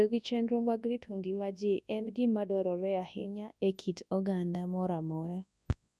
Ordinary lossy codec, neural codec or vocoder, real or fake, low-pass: none; codec, 24 kHz, 0.9 kbps, WavTokenizer, large speech release; fake; none